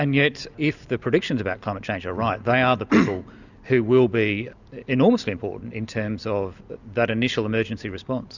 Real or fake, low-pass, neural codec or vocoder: real; 7.2 kHz; none